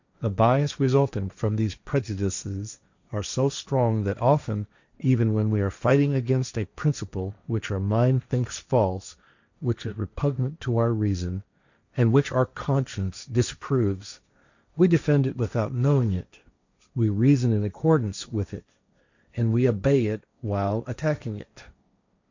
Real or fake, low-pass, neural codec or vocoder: fake; 7.2 kHz; codec, 16 kHz, 1.1 kbps, Voila-Tokenizer